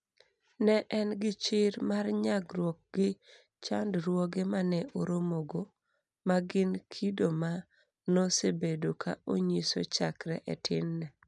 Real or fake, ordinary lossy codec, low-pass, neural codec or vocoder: real; none; 10.8 kHz; none